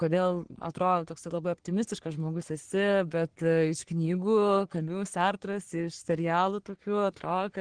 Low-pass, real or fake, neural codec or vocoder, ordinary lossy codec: 9.9 kHz; fake; codec, 44.1 kHz, 3.4 kbps, Pupu-Codec; Opus, 16 kbps